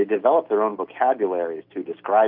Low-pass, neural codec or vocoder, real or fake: 5.4 kHz; none; real